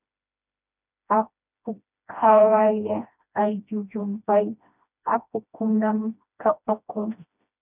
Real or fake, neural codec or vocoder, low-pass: fake; codec, 16 kHz, 1 kbps, FreqCodec, smaller model; 3.6 kHz